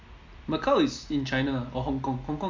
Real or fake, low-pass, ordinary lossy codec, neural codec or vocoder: real; 7.2 kHz; MP3, 48 kbps; none